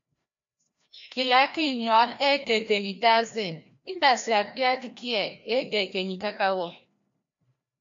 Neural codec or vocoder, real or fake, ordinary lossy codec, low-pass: codec, 16 kHz, 1 kbps, FreqCodec, larger model; fake; AAC, 64 kbps; 7.2 kHz